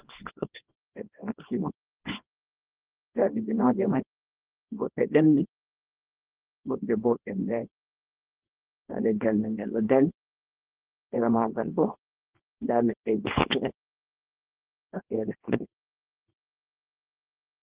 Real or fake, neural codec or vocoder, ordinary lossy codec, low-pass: fake; codec, 24 kHz, 0.9 kbps, WavTokenizer, small release; Opus, 16 kbps; 3.6 kHz